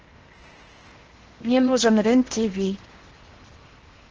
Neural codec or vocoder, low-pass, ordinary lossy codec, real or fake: codec, 16 kHz in and 24 kHz out, 0.8 kbps, FocalCodec, streaming, 65536 codes; 7.2 kHz; Opus, 16 kbps; fake